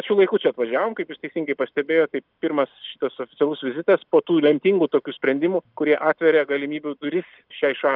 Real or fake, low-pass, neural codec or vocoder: real; 5.4 kHz; none